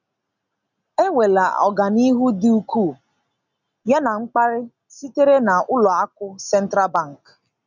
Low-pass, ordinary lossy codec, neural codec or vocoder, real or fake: 7.2 kHz; none; none; real